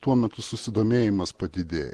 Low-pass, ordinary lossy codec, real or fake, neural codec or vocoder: 10.8 kHz; Opus, 16 kbps; real; none